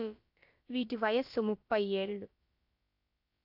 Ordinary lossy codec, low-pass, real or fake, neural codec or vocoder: AAC, 48 kbps; 5.4 kHz; fake; codec, 16 kHz, about 1 kbps, DyCAST, with the encoder's durations